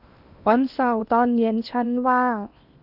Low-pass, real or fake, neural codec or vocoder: 5.4 kHz; fake; codec, 16 kHz in and 24 kHz out, 0.8 kbps, FocalCodec, streaming, 65536 codes